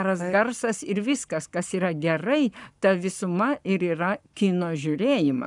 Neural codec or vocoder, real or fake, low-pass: codec, 44.1 kHz, 7.8 kbps, Pupu-Codec; fake; 10.8 kHz